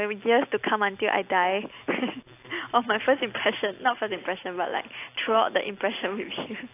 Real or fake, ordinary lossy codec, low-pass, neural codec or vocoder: real; AAC, 24 kbps; 3.6 kHz; none